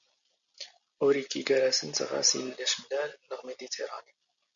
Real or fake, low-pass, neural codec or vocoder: real; 7.2 kHz; none